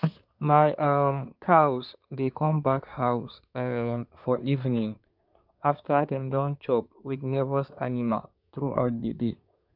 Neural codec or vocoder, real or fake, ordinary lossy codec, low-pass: codec, 24 kHz, 1 kbps, SNAC; fake; none; 5.4 kHz